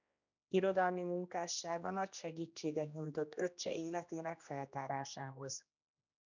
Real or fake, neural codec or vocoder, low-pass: fake; codec, 16 kHz, 1 kbps, X-Codec, HuBERT features, trained on general audio; 7.2 kHz